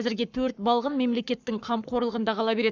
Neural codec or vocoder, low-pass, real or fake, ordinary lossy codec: codec, 44.1 kHz, 7.8 kbps, Pupu-Codec; 7.2 kHz; fake; none